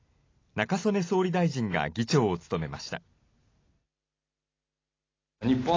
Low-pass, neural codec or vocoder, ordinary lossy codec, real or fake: 7.2 kHz; none; AAC, 32 kbps; real